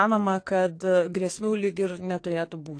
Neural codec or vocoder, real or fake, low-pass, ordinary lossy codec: codec, 44.1 kHz, 2.6 kbps, SNAC; fake; 9.9 kHz; AAC, 48 kbps